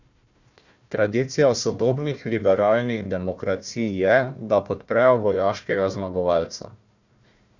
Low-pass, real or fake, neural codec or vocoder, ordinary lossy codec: 7.2 kHz; fake; codec, 16 kHz, 1 kbps, FunCodec, trained on Chinese and English, 50 frames a second; none